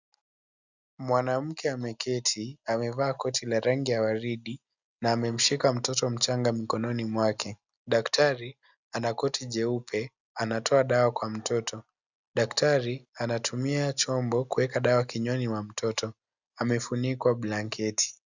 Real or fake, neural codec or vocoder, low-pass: real; none; 7.2 kHz